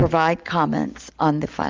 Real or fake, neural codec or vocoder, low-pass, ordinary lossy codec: real; none; 7.2 kHz; Opus, 32 kbps